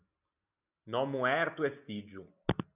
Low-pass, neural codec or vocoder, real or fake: 3.6 kHz; none; real